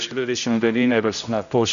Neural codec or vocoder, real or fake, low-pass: codec, 16 kHz, 0.5 kbps, X-Codec, HuBERT features, trained on general audio; fake; 7.2 kHz